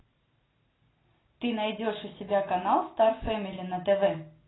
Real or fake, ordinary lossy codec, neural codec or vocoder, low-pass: real; AAC, 16 kbps; none; 7.2 kHz